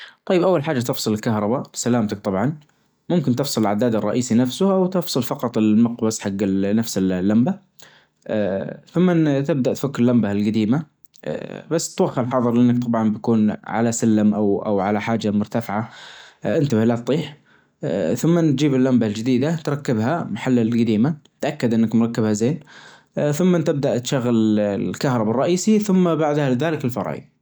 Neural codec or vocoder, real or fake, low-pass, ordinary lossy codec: none; real; none; none